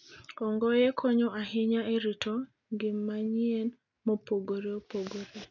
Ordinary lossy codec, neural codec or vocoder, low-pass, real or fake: none; none; 7.2 kHz; real